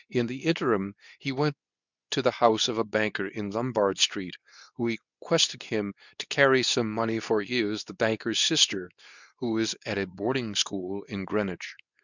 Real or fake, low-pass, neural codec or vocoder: fake; 7.2 kHz; codec, 24 kHz, 0.9 kbps, WavTokenizer, medium speech release version 2